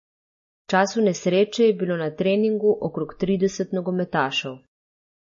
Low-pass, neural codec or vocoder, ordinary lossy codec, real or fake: 7.2 kHz; none; MP3, 32 kbps; real